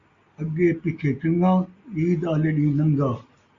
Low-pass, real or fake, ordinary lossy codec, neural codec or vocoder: 7.2 kHz; real; Opus, 64 kbps; none